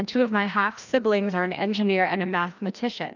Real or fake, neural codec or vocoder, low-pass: fake; codec, 16 kHz, 1 kbps, FreqCodec, larger model; 7.2 kHz